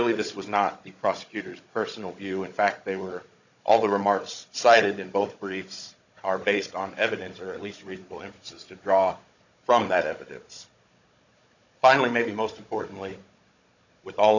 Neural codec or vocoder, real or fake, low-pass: codec, 16 kHz, 16 kbps, FunCodec, trained on Chinese and English, 50 frames a second; fake; 7.2 kHz